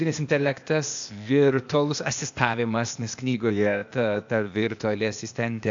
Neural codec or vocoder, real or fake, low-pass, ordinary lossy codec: codec, 16 kHz, 0.8 kbps, ZipCodec; fake; 7.2 kHz; AAC, 64 kbps